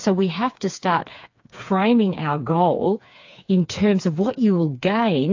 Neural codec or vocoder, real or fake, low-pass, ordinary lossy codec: codec, 16 kHz, 4 kbps, FreqCodec, smaller model; fake; 7.2 kHz; AAC, 48 kbps